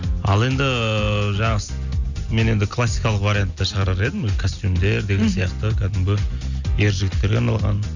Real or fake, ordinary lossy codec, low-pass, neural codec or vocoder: real; none; 7.2 kHz; none